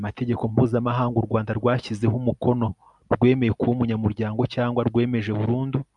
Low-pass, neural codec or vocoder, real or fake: 10.8 kHz; none; real